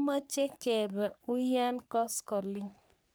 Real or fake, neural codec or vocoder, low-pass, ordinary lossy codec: fake; codec, 44.1 kHz, 3.4 kbps, Pupu-Codec; none; none